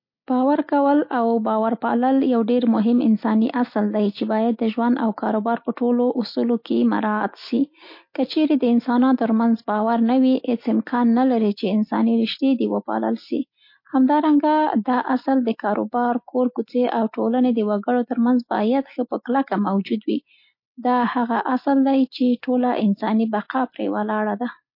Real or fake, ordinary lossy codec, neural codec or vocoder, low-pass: real; MP3, 32 kbps; none; 5.4 kHz